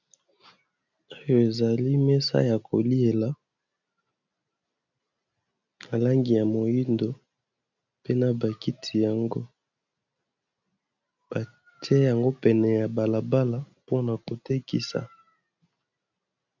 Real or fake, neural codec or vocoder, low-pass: real; none; 7.2 kHz